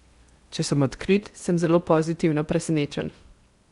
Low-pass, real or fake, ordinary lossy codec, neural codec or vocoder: 10.8 kHz; fake; Opus, 64 kbps; codec, 16 kHz in and 24 kHz out, 0.8 kbps, FocalCodec, streaming, 65536 codes